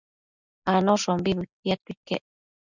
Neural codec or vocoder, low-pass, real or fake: none; 7.2 kHz; real